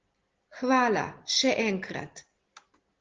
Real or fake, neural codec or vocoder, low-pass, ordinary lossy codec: real; none; 7.2 kHz; Opus, 16 kbps